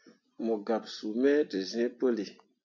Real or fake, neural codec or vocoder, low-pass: real; none; 7.2 kHz